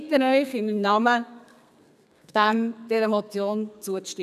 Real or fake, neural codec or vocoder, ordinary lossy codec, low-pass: fake; codec, 32 kHz, 1.9 kbps, SNAC; none; 14.4 kHz